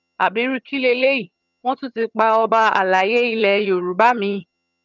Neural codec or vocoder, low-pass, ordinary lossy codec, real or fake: vocoder, 22.05 kHz, 80 mel bands, HiFi-GAN; 7.2 kHz; none; fake